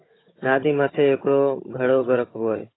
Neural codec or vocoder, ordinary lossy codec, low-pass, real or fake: codec, 16 kHz, 16 kbps, FunCodec, trained on Chinese and English, 50 frames a second; AAC, 16 kbps; 7.2 kHz; fake